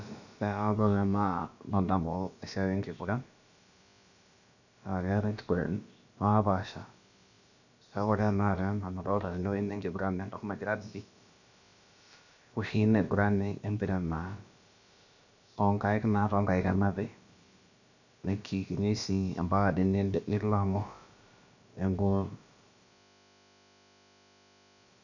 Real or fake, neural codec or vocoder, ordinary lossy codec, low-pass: fake; codec, 16 kHz, about 1 kbps, DyCAST, with the encoder's durations; none; 7.2 kHz